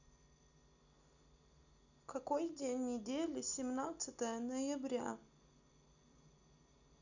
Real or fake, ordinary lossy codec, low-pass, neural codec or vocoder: real; none; 7.2 kHz; none